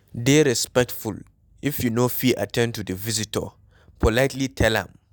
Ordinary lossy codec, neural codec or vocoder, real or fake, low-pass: none; none; real; none